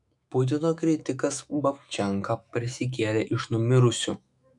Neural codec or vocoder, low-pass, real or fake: autoencoder, 48 kHz, 128 numbers a frame, DAC-VAE, trained on Japanese speech; 10.8 kHz; fake